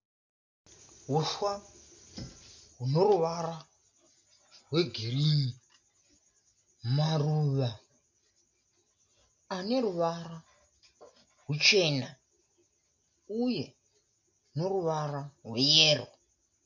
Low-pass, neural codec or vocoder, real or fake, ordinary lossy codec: 7.2 kHz; none; real; MP3, 48 kbps